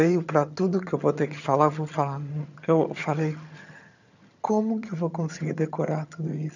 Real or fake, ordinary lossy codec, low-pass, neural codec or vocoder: fake; none; 7.2 kHz; vocoder, 22.05 kHz, 80 mel bands, HiFi-GAN